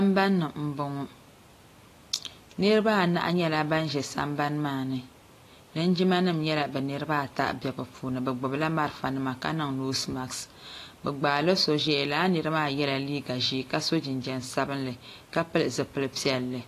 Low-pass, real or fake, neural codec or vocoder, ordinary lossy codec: 14.4 kHz; real; none; AAC, 48 kbps